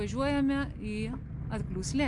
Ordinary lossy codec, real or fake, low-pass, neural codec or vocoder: MP3, 64 kbps; real; 10.8 kHz; none